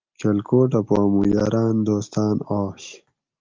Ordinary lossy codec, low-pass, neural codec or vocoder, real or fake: Opus, 32 kbps; 7.2 kHz; none; real